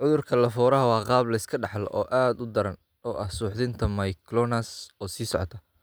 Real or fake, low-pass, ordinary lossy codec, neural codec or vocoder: real; none; none; none